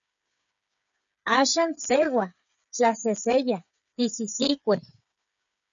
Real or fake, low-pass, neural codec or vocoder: fake; 7.2 kHz; codec, 16 kHz, 8 kbps, FreqCodec, smaller model